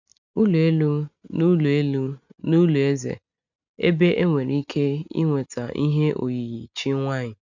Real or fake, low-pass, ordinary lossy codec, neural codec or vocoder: real; 7.2 kHz; none; none